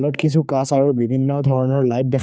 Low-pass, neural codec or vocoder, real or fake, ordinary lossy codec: none; codec, 16 kHz, 4 kbps, X-Codec, HuBERT features, trained on general audio; fake; none